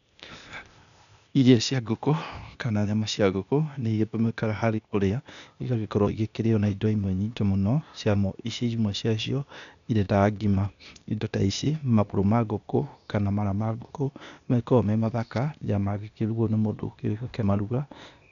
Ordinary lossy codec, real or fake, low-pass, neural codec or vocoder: none; fake; 7.2 kHz; codec, 16 kHz, 0.8 kbps, ZipCodec